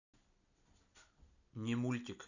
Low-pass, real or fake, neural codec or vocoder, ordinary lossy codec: 7.2 kHz; real; none; none